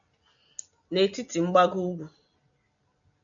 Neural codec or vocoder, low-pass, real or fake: none; 7.2 kHz; real